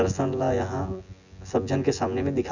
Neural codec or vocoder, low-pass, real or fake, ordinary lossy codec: vocoder, 24 kHz, 100 mel bands, Vocos; 7.2 kHz; fake; none